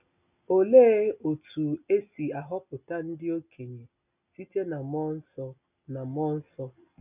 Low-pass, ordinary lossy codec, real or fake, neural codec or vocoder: 3.6 kHz; none; real; none